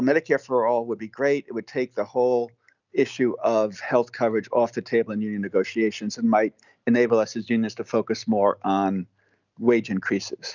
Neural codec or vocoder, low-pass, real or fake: none; 7.2 kHz; real